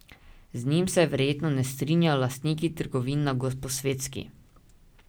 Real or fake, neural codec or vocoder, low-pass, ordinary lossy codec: real; none; none; none